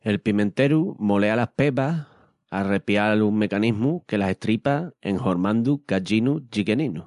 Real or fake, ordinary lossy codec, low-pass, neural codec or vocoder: real; MP3, 64 kbps; 10.8 kHz; none